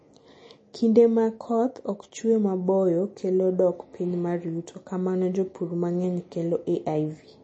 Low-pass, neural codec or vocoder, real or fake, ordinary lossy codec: 10.8 kHz; none; real; MP3, 32 kbps